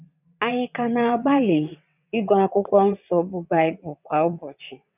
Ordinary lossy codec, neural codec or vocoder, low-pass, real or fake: none; vocoder, 22.05 kHz, 80 mel bands, WaveNeXt; 3.6 kHz; fake